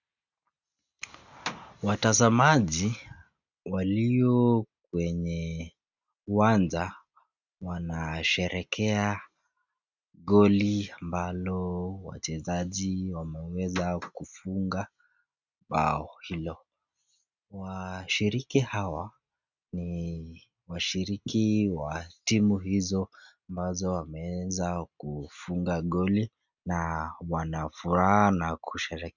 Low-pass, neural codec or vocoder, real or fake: 7.2 kHz; none; real